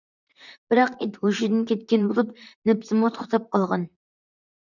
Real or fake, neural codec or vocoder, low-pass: fake; vocoder, 44.1 kHz, 128 mel bands, Pupu-Vocoder; 7.2 kHz